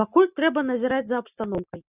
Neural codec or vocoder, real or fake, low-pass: none; real; 3.6 kHz